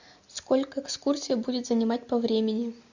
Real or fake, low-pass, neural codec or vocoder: real; 7.2 kHz; none